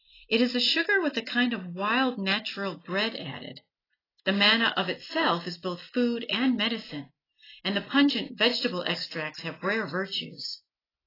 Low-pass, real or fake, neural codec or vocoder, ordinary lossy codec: 5.4 kHz; real; none; AAC, 24 kbps